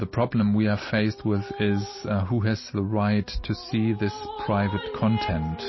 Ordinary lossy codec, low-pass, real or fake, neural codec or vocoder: MP3, 24 kbps; 7.2 kHz; real; none